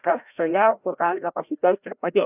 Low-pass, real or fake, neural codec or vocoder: 3.6 kHz; fake; codec, 16 kHz, 0.5 kbps, FreqCodec, larger model